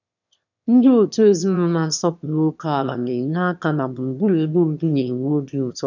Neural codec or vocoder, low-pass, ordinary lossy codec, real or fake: autoencoder, 22.05 kHz, a latent of 192 numbers a frame, VITS, trained on one speaker; 7.2 kHz; none; fake